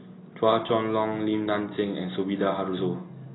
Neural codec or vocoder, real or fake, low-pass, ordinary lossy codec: none; real; 7.2 kHz; AAC, 16 kbps